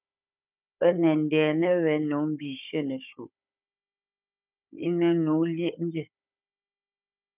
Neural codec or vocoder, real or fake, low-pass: codec, 16 kHz, 16 kbps, FunCodec, trained on Chinese and English, 50 frames a second; fake; 3.6 kHz